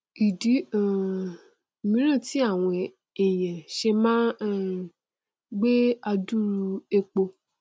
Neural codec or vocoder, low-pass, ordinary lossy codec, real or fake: none; none; none; real